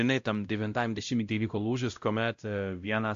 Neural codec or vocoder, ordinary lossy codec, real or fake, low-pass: codec, 16 kHz, 0.5 kbps, X-Codec, WavLM features, trained on Multilingual LibriSpeech; AAC, 64 kbps; fake; 7.2 kHz